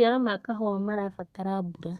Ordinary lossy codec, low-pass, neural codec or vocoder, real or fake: Opus, 64 kbps; 14.4 kHz; codec, 32 kHz, 1.9 kbps, SNAC; fake